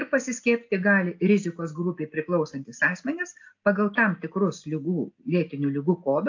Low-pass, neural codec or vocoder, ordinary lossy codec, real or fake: 7.2 kHz; none; AAC, 48 kbps; real